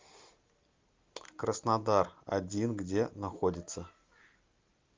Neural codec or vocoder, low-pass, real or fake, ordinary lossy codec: none; 7.2 kHz; real; Opus, 32 kbps